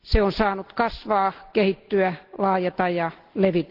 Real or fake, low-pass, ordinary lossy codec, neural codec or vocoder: real; 5.4 kHz; Opus, 16 kbps; none